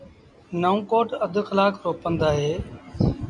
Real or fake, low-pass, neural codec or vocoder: real; 10.8 kHz; none